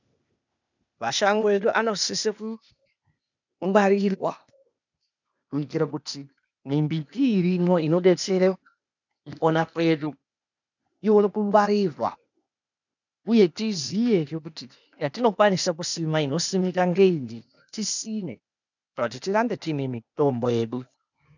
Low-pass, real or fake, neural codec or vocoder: 7.2 kHz; fake; codec, 16 kHz, 0.8 kbps, ZipCodec